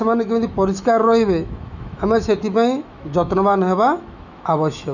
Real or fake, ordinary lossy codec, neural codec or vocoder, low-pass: fake; none; autoencoder, 48 kHz, 128 numbers a frame, DAC-VAE, trained on Japanese speech; 7.2 kHz